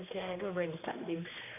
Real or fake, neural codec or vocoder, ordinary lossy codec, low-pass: fake; codec, 16 kHz, 2 kbps, X-Codec, HuBERT features, trained on general audio; AAC, 32 kbps; 3.6 kHz